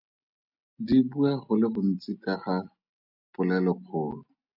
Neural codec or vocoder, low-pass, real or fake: none; 5.4 kHz; real